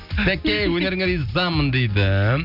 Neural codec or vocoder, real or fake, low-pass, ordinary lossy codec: none; real; 5.4 kHz; none